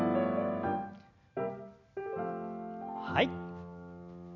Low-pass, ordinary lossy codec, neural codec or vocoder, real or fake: 7.2 kHz; none; none; real